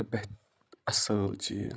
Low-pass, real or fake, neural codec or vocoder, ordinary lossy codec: none; fake; codec, 16 kHz, 16 kbps, FreqCodec, larger model; none